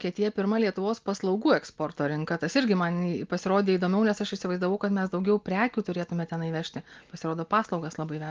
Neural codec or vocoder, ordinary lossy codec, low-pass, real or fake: none; Opus, 32 kbps; 7.2 kHz; real